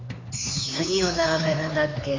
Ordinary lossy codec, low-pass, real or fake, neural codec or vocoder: AAC, 32 kbps; 7.2 kHz; fake; codec, 16 kHz, 4 kbps, X-Codec, HuBERT features, trained on LibriSpeech